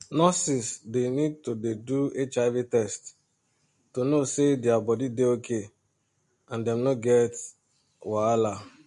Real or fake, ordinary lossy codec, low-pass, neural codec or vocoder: real; MP3, 48 kbps; 14.4 kHz; none